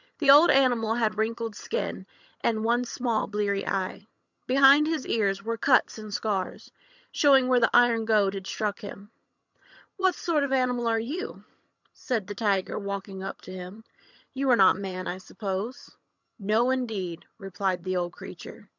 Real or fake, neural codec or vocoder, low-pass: fake; vocoder, 22.05 kHz, 80 mel bands, HiFi-GAN; 7.2 kHz